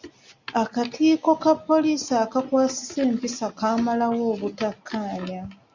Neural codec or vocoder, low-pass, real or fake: none; 7.2 kHz; real